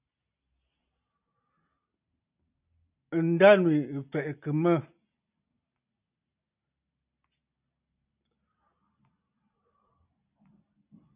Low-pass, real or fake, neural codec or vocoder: 3.6 kHz; real; none